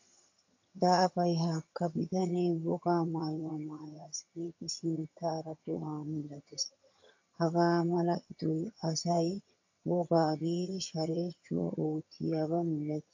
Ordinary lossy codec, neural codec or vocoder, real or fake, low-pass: AAC, 48 kbps; vocoder, 22.05 kHz, 80 mel bands, HiFi-GAN; fake; 7.2 kHz